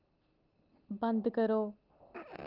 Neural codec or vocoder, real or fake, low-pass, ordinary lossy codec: none; real; 5.4 kHz; Opus, 32 kbps